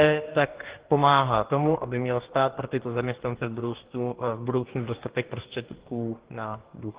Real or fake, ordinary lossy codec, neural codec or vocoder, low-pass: fake; Opus, 16 kbps; codec, 44.1 kHz, 2.6 kbps, DAC; 3.6 kHz